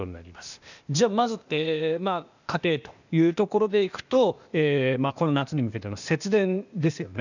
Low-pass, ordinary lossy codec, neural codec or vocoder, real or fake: 7.2 kHz; none; codec, 16 kHz, 0.8 kbps, ZipCodec; fake